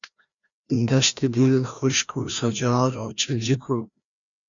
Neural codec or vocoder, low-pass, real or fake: codec, 16 kHz, 1 kbps, FreqCodec, larger model; 7.2 kHz; fake